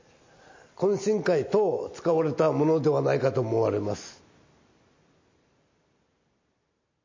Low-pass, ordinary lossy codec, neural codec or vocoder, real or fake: 7.2 kHz; MP3, 32 kbps; none; real